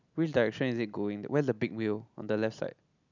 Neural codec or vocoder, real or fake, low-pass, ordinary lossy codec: none; real; 7.2 kHz; none